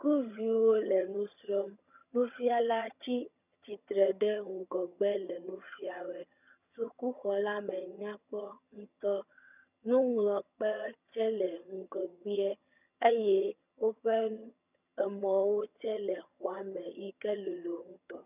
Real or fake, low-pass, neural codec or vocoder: fake; 3.6 kHz; vocoder, 22.05 kHz, 80 mel bands, HiFi-GAN